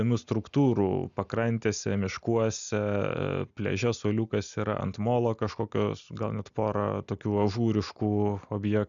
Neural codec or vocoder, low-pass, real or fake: none; 7.2 kHz; real